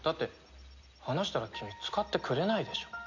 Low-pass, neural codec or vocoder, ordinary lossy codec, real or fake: 7.2 kHz; none; none; real